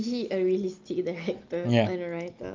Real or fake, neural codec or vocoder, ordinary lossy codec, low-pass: real; none; Opus, 32 kbps; 7.2 kHz